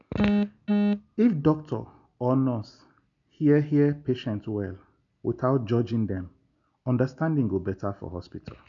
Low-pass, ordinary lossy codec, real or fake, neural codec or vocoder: 7.2 kHz; none; real; none